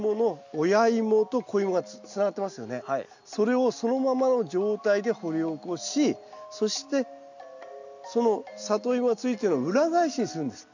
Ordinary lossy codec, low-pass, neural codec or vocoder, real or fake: none; 7.2 kHz; none; real